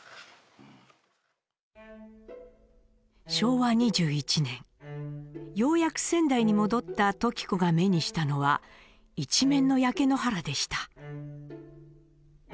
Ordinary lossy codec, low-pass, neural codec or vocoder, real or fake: none; none; none; real